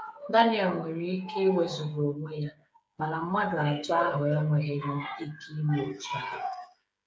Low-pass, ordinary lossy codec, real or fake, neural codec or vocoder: none; none; fake; codec, 16 kHz, 8 kbps, FreqCodec, smaller model